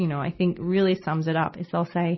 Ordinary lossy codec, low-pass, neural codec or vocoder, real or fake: MP3, 24 kbps; 7.2 kHz; none; real